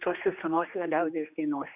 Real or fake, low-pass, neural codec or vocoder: fake; 3.6 kHz; codec, 16 kHz, 2 kbps, X-Codec, HuBERT features, trained on general audio